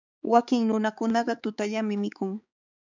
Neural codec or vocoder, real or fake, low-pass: codec, 16 kHz, 4 kbps, X-Codec, HuBERT features, trained on balanced general audio; fake; 7.2 kHz